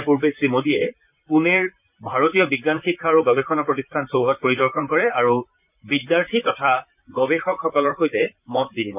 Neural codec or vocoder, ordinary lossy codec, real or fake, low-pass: vocoder, 44.1 kHz, 128 mel bands, Pupu-Vocoder; none; fake; 3.6 kHz